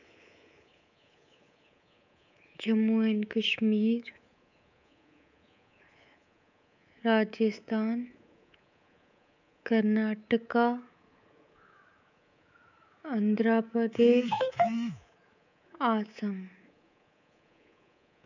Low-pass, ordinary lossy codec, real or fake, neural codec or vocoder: 7.2 kHz; none; fake; codec, 24 kHz, 3.1 kbps, DualCodec